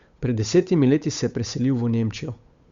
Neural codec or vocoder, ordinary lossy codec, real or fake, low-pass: codec, 16 kHz, 8 kbps, FunCodec, trained on Chinese and English, 25 frames a second; Opus, 64 kbps; fake; 7.2 kHz